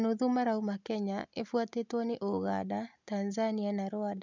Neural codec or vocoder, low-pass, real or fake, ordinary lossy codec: none; 7.2 kHz; real; none